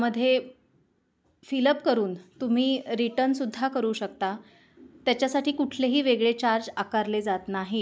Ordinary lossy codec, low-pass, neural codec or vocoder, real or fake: none; none; none; real